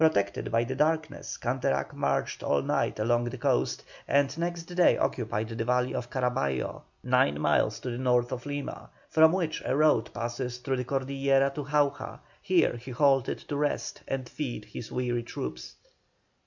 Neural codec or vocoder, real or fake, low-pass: none; real; 7.2 kHz